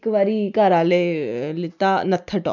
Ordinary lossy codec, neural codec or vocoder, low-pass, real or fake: none; none; 7.2 kHz; real